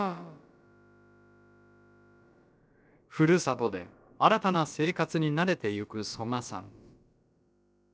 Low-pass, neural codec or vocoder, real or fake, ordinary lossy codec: none; codec, 16 kHz, about 1 kbps, DyCAST, with the encoder's durations; fake; none